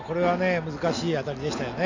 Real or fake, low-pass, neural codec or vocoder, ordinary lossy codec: real; 7.2 kHz; none; AAC, 48 kbps